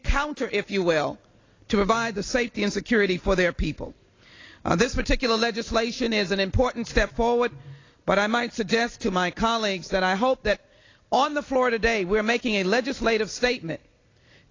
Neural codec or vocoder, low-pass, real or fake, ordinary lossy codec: none; 7.2 kHz; real; AAC, 32 kbps